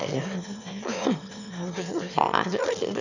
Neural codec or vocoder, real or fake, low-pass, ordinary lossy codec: autoencoder, 22.05 kHz, a latent of 192 numbers a frame, VITS, trained on one speaker; fake; 7.2 kHz; none